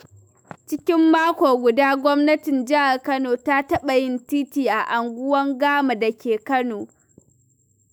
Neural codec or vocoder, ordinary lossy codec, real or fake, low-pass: autoencoder, 48 kHz, 128 numbers a frame, DAC-VAE, trained on Japanese speech; none; fake; none